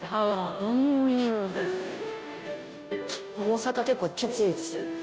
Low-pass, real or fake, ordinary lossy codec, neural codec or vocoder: none; fake; none; codec, 16 kHz, 0.5 kbps, FunCodec, trained on Chinese and English, 25 frames a second